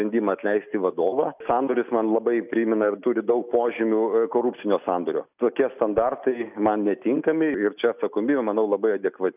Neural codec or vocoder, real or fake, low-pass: none; real; 3.6 kHz